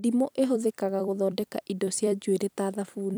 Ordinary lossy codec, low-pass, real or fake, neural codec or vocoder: none; none; fake; vocoder, 44.1 kHz, 128 mel bands every 256 samples, BigVGAN v2